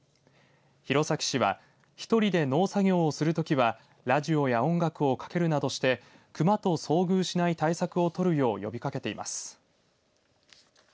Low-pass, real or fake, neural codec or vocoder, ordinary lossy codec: none; real; none; none